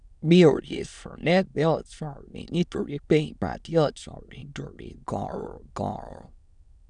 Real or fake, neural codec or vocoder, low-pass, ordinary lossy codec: fake; autoencoder, 22.05 kHz, a latent of 192 numbers a frame, VITS, trained on many speakers; 9.9 kHz; none